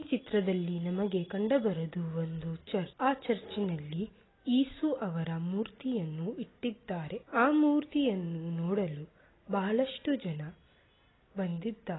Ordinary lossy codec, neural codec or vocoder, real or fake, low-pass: AAC, 16 kbps; none; real; 7.2 kHz